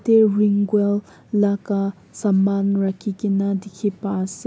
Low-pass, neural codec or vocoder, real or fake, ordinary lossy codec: none; none; real; none